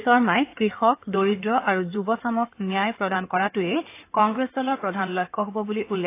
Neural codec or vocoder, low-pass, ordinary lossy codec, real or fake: codec, 16 kHz in and 24 kHz out, 2.2 kbps, FireRedTTS-2 codec; 3.6 kHz; AAC, 24 kbps; fake